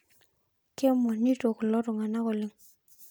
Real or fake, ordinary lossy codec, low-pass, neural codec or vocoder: real; none; none; none